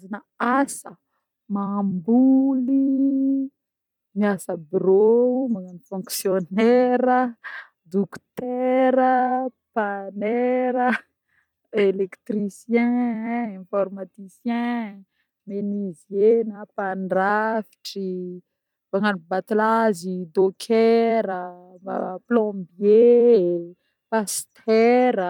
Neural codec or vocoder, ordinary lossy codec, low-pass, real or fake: vocoder, 44.1 kHz, 128 mel bands every 256 samples, BigVGAN v2; none; 19.8 kHz; fake